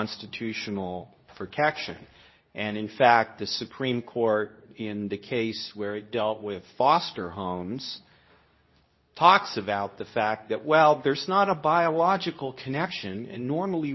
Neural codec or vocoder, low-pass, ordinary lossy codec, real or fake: codec, 24 kHz, 0.9 kbps, WavTokenizer, medium speech release version 2; 7.2 kHz; MP3, 24 kbps; fake